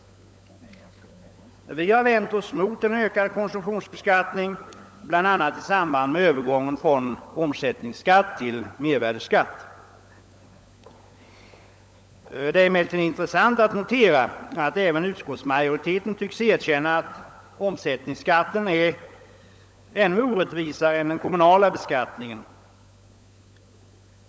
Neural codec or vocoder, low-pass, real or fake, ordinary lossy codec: codec, 16 kHz, 16 kbps, FunCodec, trained on LibriTTS, 50 frames a second; none; fake; none